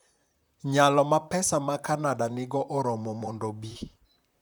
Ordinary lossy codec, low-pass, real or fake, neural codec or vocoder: none; none; fake; vocoder, 44.1 kHz, 128 mel bands, Pupu-Vocoder